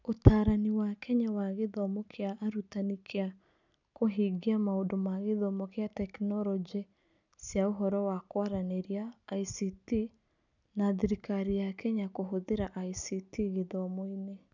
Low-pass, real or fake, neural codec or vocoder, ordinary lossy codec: 7.2 kHz; real; none; none